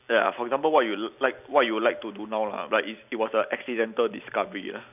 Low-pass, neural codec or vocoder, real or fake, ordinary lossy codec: 3.6 kHz; none; real; none